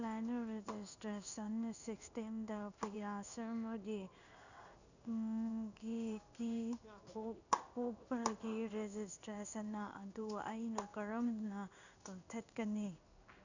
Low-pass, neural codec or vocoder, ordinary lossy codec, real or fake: 7.2 kHz; codec, 16 kHz in and 24 kHz out, 1 kbps, XY-Tokenizer; none; fake